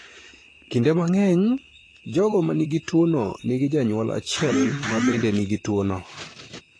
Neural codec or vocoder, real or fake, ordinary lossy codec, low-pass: vocoder, 44.1 kHz, 128 mel bands, Pupu-Vocoder; fake; AAC, 32 kbps; 9.9 kHz